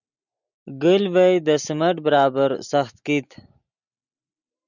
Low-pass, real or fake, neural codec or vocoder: 7.2 kHz; real; none